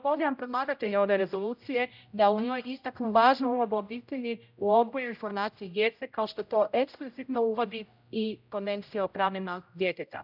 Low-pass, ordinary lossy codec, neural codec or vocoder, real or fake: 5.4 kHz; none; codec, 16 kHz, 0.5 kbps, X-Codec, HuBERT features, trained on general audio; fake